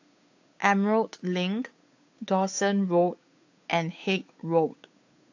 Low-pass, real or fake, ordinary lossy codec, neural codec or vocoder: 7.2 kHz; fake; AAC, 48 kbps; codec, 16 kHz, 8 kbps, FunCodec, trained on Chinese and English, 25 frames a second